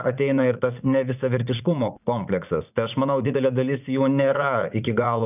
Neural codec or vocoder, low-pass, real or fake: vocoder, 44.1 kHz, 80 mel bands, Vocos; 3.6 kHz; fake